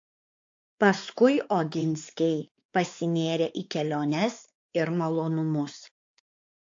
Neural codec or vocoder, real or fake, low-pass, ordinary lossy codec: codec, 16 kHz, 4 kbps, X-Codec, WavLM features, trained on Multilingual LibriSpeech; fake; 7.2 kHz; AAC, 48 kbps